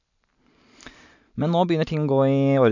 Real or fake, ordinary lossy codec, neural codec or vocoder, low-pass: real; none; none; 7.2 kHz